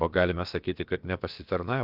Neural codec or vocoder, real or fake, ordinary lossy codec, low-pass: codec, 16 kHz, about 1 kbps, DyCAST, with the encoder's durations; fake; Opus, 24 kbps; 5.4 kHz